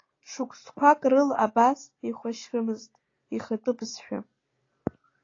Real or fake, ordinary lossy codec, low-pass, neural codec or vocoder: real; AAC, 32 kbps; 7.2 kHz; none